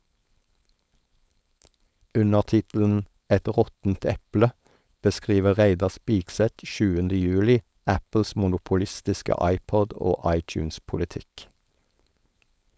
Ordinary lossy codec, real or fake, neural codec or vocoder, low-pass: none; fake; codec, 16 kHz, 4.8 kbps, FACodec; none